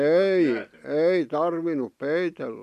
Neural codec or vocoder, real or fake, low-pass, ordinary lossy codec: none; real; 14.4 kHz; MP3, 64 kbps